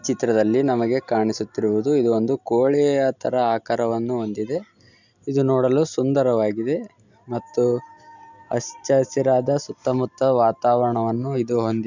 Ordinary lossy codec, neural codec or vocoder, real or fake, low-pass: none; none; real; 7.2 kHz